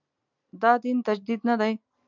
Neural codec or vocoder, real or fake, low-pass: none; real; 7.2 kHz